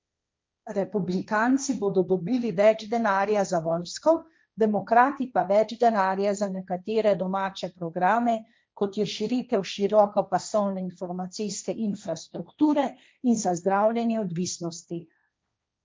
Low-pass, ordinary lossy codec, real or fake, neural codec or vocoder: none; none; fake; codec, 16 kHz, 1.1 kbps, Voila-Tokenizer